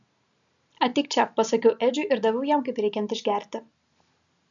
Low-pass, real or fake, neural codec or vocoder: 7.2 kHz; real; none